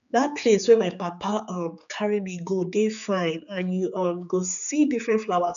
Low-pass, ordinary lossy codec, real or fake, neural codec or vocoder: 7.2 kHz; none; fake; codec, 16 kHz, 4 kbps, X-Codec, HuBERT features, trained on general audio